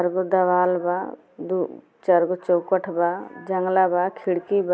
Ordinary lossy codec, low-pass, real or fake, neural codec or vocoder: none; none; real; none